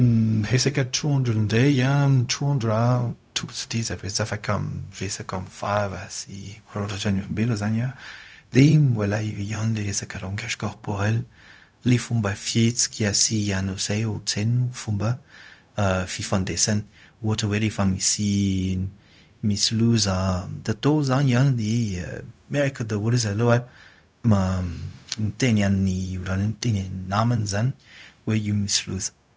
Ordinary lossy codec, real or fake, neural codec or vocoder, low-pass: none; fake; codec, 16 kHz, 0.4 kbps, LongCat-Audio-Codec; none